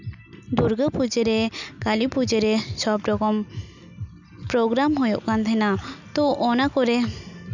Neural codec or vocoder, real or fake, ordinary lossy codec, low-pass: none; real; none; 7.2 kHz